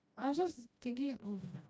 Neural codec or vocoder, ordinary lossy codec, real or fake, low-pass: codec, 16 kHz, 1 kbps, FreqCodec, smaller model; none; fake; none